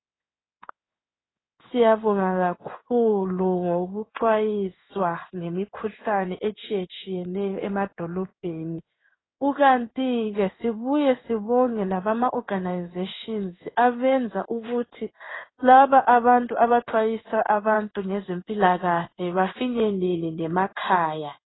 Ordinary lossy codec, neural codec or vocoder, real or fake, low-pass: AAC, 16 kbps; codec, 16 kHz in and 24 kHz out, 1 kbps, XY-Tokenizer; fake; 7.2 kHz